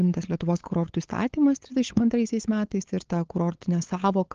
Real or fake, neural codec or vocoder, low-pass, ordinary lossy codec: fake; codec, 16 kHz, 8 kbps, FunCodec, trained on LibriTTS, 25 frames a second; 7.2 kHz; Opus, 16 kbps